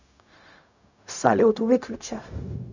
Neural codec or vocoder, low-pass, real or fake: codec, 16 kHz, 0.4 kbps, LongCat-Audio-Codec; 7.2 kHz; fake